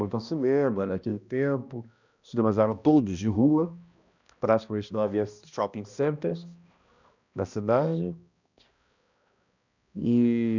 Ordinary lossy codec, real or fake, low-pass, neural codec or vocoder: none; fake; 7.2 kHz; codec, 16 kHz, 1 kbps, X-Codec, HuBERT features, trained on balanced general audio